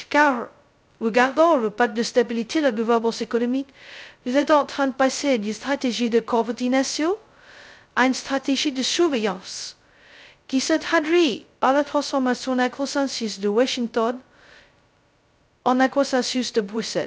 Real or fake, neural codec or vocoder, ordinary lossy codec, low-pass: fake; codec, 16 kHz, 0.2 kbps, FocalCodec; none; none